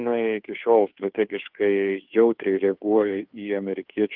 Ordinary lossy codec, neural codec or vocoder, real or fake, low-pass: Opus, 32 kbps; codec, 16 kHz, 2 kbps, FunCodec, trained on Chinese and English, 25 frames a second; fake; 5.4 kHz